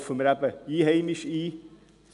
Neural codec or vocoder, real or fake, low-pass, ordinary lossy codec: none; real; 10.8 kHz; none